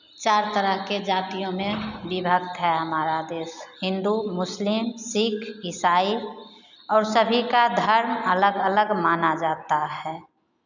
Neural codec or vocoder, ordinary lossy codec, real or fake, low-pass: none; none; real; 7.2 kHz